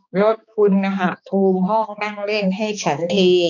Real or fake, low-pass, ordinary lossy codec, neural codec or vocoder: fake; 7.2 kHz; none; codec, 16 kHz, 2 kbps, X-Codec, HuBERT features, trained on balanced general audio